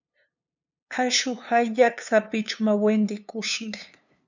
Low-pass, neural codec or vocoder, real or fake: 7.2 kHz; codec, 16 kHz, 2 kbps, FunCodec, trained on LibriTTS, 25 frames a second; fake